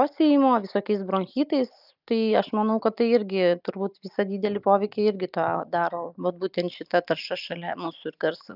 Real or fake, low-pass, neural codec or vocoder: real; 5.4 kHz; none